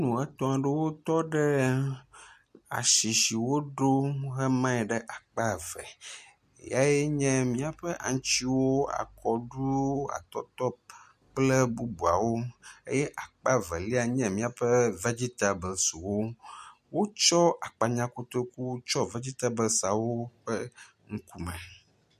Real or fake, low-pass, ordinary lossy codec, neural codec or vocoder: real; 14.4 kHz; MP3, 64 kbps; none